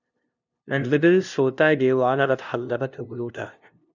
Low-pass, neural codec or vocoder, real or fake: 7.2 kHz; codec, 16 kHz, 0.5 kbps, FunCodec, trained on LibriTTS, 25 frames a second; fake